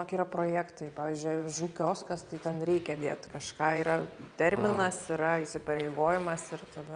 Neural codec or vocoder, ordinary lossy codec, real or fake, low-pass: vocoder, 22.05 kHz, 80 mel bands, WaveNeXt; Opus, 64 kbps; fake; 9.9 kHz